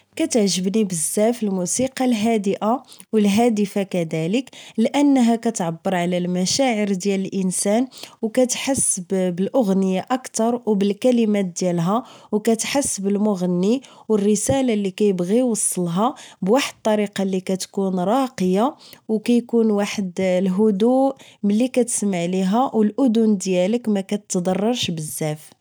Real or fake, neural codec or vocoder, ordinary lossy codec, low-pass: real; none; none; none